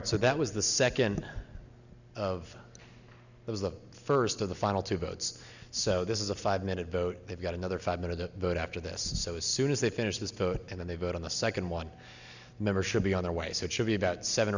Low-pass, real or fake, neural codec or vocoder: 7.2 kHz; real; none